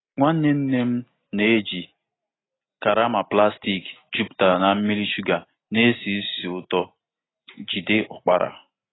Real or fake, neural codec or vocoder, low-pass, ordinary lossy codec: real; none; 7.2 kHz; AAC, 16 kbps